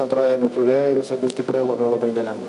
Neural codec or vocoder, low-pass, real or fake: codec, 24 kHz, 0.9 kbps, WavTokenizer, medium music audio release; 10.8 kHz; fake